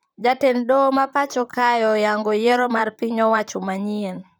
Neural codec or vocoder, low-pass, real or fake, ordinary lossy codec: vocoder, 44.1 kHz, 128 mel bands, Pupu-Vocoder; none; fake; none